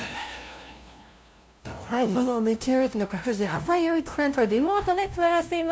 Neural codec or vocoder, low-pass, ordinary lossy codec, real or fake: codec, 16 kHz, 0.5 kbps, FunCodec, trained on LibriTTS, 25 frames a second; none; none; fake